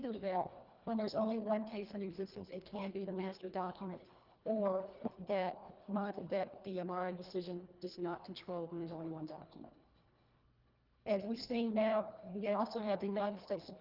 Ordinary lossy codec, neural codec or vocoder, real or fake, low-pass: Opus, 32 kbps; codec, 24 kHz, 1.5 kbps, HILCodec; fake; 5.4 kHz